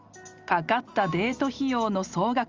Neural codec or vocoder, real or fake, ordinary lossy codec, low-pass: none; real; Opus, 32 kbps; 7.2 kHz